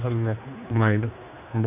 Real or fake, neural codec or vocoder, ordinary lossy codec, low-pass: fake; codec, 16 kHz, 2 kbps, X-Codec, HuBERT features, trained on general audio; none; 3.6 kHz